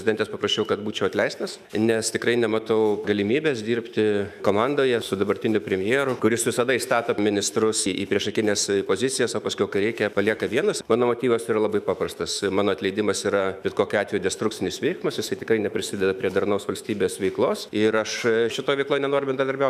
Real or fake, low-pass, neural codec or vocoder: fake; 14.4 kHz; autoencoder, 48 kHz, 128 numbers a frame, DAC-VAE, trained on Japanese speech